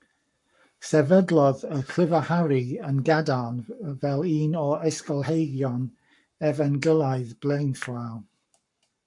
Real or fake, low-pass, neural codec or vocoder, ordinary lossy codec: fake; 10.8 kHz; codec, 44.1 kHz, 7.8 kbps, Pupu-Codec; MP3, 64 kbps